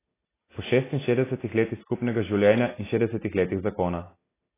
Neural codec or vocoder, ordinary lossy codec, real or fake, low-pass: none; AAC, 16 kbps; real; 3.6 kHz